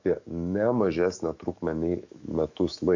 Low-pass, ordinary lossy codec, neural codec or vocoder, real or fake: 7.2 kHz; AAC, 48 kbps; autoencoder, 48 kHz, 128 numbers a frame, DAC-VAE, trained on Japanese speech; fake